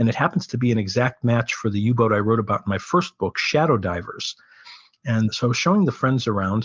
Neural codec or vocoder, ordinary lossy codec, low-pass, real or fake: none; Opus, 24 kbps; 7.2 kHz; real